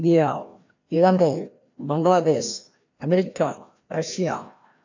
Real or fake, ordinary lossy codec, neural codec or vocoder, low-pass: fake; AAC, 48 kbps; codec, 16 kHz, 1 kbps, FreqCodec, larger model; 7.2 kHz